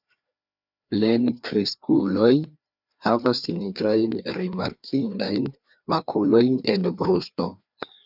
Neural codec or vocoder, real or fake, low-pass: codec, 16 kHz, 2 kbps, FreqCodec, larger model; fake; 5.4 kHz